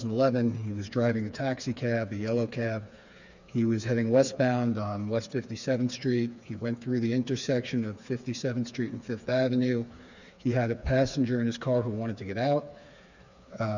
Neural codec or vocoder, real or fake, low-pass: codec, 16 kHz, 4 kbps, FreqCodec, smaller model; fake; 7.2 kHz